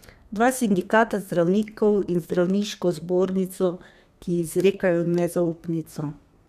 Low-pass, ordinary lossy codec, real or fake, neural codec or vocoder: 14.4 kHz; none; fake; codec, 32 kHz, 1.9 kbps, SNAC